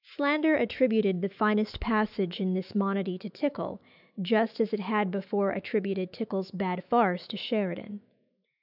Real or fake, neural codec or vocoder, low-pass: fake; autoencoder, 48 kHz, 128 numbers a frame, DAC-VAE, trained on Japanese speech; 5.4 kHz